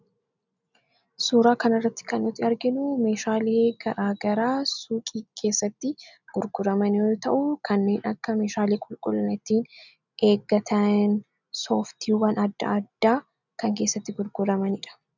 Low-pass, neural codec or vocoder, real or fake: 7.2 kHz; none; real